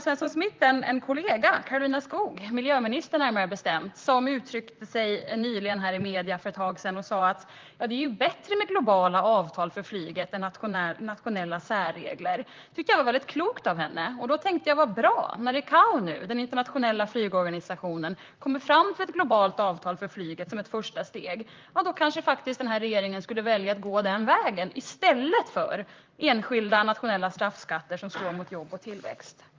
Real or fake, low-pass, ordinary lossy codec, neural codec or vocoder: fake; 7.2 kHz; Opus, 32 kbps; vocoder, 44.1 kHz, 128 mel bands, Pupu-Vocoder